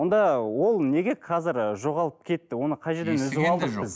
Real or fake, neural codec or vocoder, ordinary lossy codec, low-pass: real; none; none; none